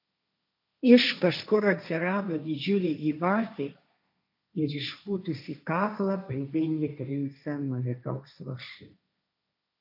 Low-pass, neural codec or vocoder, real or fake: 5.4 kHz; codec, 16 kHz, 1.1 kbps, Voila-Tokenizer; fake